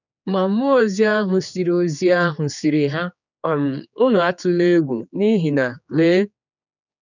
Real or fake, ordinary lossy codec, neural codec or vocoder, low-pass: fake; none; codec, 16 kHz, 4 kbps, X-Codec, HuBERT features, trained on general audio; 7.2 kHz